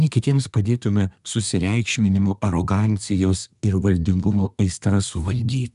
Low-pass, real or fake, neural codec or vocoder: 10.8 kHz; fake; codec, 24 kHz, 1 kbps, SNAC